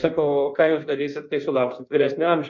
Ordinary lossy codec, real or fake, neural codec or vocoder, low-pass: MP3, 64 kbps; fake; codec, 16 kHz in and 24 kHz out, 1.1 kbps, FireRedTTS-2 codec; 7.2 kHz